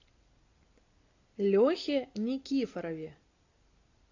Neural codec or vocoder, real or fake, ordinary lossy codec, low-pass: none; real; AAC, 48 kbps; 7.2 kHz